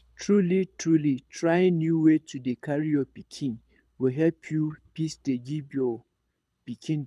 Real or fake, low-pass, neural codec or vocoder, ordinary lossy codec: fake; none; codec, 24 kHz, 6 kbps, HILCodec; none